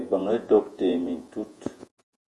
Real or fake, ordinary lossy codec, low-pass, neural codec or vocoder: fake; Opus, 32 kbps; 10.8 kHz; vocoder, 48 kHz, 128 mel bands, Vocos